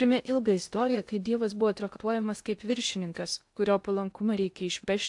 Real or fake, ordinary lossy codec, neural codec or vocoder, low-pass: fake; AAC, 64 kbps; codec, 16 kHz in and 24 kHz out, 0.6 kbps, FocalCodec, streaming, 2048 codes; 10.8 kHz